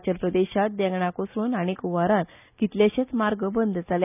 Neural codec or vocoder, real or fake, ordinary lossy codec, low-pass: none; real; none; 3.6 kHz